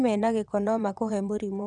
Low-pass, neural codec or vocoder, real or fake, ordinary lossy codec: 9.9 kHz; vocoder, 22.05 kHz, 80 mel bands, WaveNeXt; fake; none